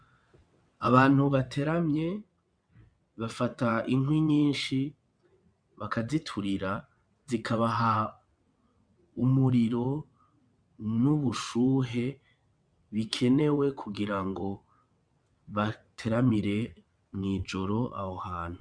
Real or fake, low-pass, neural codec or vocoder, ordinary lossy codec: fake; 9.9 kHz; vocoder, 22.05 kHz, 80 mel bands, WaveNeXt; MP3, 96 kbps